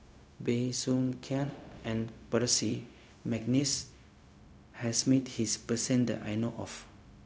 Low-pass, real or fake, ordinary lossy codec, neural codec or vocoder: none; fake; none; codec, 16 kHz, 0.4 kbps, LongCat-Audio-Codec